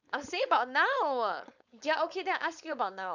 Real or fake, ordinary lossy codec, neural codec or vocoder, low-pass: fake; none; codec, 16 kHz, 4.8 kbps, FACodec; 7.2 kHz